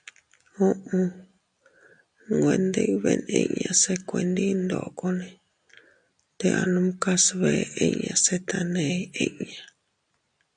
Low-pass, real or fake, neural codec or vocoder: 9.9 kHz; real; none